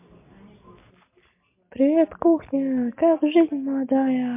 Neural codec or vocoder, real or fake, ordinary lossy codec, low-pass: none; real; MP3, 32 kbps; 3.6 kHz